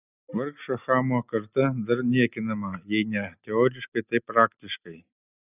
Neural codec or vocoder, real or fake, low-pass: none; real; 3.6 kHz